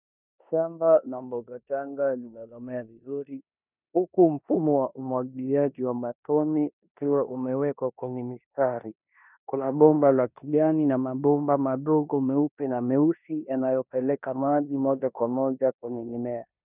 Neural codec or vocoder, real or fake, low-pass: codec, 16 kHz in and 24 kHz out, 0.9 kbps, LongCat-Audio-Codec, four codebook decoder; fake; 3.6 kHz